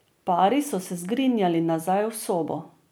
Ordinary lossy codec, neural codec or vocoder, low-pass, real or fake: none; none; none; real